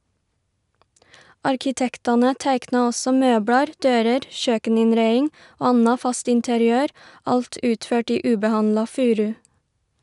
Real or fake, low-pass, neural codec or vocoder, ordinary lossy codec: real; 10.8 kHz; none; none